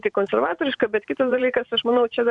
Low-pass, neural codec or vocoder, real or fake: 10.8 kHz; vocoder, 44.1 kHz, 128 mel bands every 512 samples, BigVGAN v2; fake